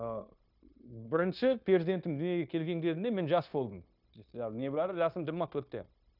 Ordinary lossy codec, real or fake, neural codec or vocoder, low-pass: none; fake; codec, 16 kHz, 0.9 kbps, LongCat-Audio-Codec; 5.4 kHz